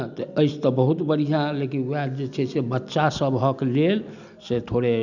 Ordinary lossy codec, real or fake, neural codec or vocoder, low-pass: none; real; none; 7.2 kHz